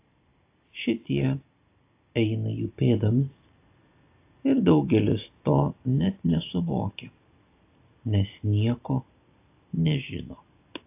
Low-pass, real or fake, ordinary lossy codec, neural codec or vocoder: 3.6 kHz; real; AAC, 24 kbps; none